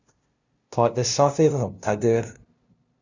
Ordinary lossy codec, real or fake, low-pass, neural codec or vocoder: Opus, 64 kbps; fake; 7.2 kHz; codec, 16 kHz, 0.5 kbps, FunCodec, trained on LibriTTS, 25 frames a second